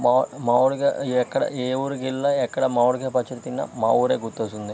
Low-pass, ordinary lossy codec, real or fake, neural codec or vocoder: none; none; real; none